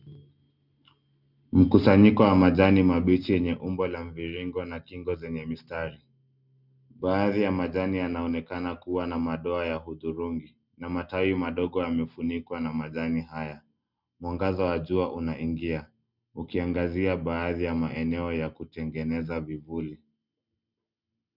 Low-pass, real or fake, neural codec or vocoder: 5.4 kHz; real; none